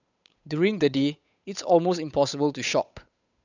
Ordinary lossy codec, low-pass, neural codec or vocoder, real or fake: none; 7.2 kHz; codec, 16 kHz, 8 kbps, FunCodec, trained on Chinese and English, 25 frames a second; fake